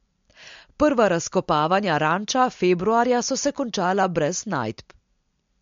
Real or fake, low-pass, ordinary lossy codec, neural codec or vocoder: real; 7.2 kHz; MP3, 48 kbps; none